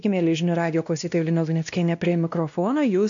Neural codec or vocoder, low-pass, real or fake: codec, 16 kHz, 1 kbps, X-Codec, WavLM features, trained on Multilingual LibriSpeech; 7.2 kHz; fake